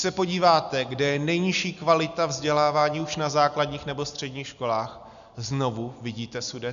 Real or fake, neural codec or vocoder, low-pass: real; none; 7.2 kHz